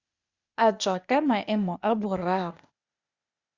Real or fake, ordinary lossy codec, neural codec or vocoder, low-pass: fake; Opus, 64 kbps; codec, 16 kHz, 0.8 kbps, ZipCodec; 7.2 kHz